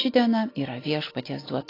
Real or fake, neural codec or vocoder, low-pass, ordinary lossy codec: real; none; 5.4 kHz; AAC, 24 kbps